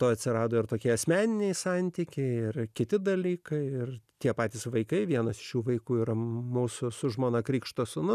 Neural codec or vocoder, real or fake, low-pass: none; real; 14.4 kHz